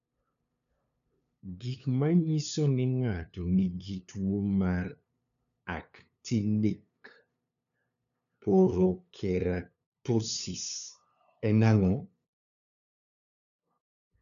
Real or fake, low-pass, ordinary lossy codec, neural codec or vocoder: fake; 7.2 kHz; none; codec, 16 kHz, 2 kbps, FunCodec, trained on LibriTTS, 25 frames a second